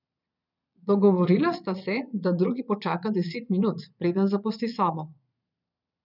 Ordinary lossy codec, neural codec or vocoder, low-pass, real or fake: none; vocoder, 22.05 kHz, 80 mel bands, Vocos; 5.4 kHz; fake